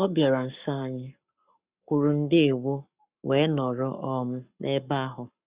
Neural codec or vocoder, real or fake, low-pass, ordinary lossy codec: codec, 44.1 kHz, 7.8 kbps, Pupu-Codec; fake; 3.6 kHz; Opus, 64 kbps